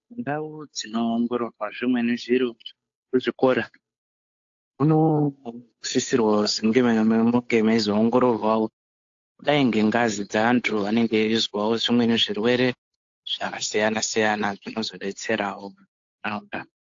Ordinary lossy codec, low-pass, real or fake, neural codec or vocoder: AAC, 48 kbps; 7.2 kHz; fake; codec, 16 kHz, 8 kbps, FunCodec, trained on Chinese and English, 25 frames a second